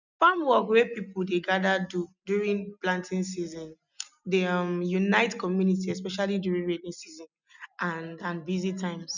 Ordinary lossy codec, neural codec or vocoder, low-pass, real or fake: none; none; 7.2 kHz; real